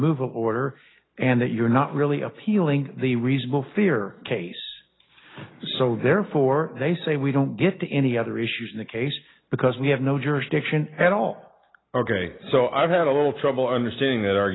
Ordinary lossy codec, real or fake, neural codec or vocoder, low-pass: AAC, 16 kbps; real; none; 7.2 kHz